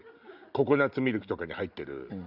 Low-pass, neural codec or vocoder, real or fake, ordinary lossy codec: 5.4 kHz; none; real; none